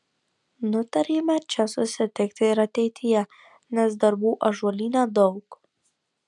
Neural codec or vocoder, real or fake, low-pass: none; real; 10.8 kHz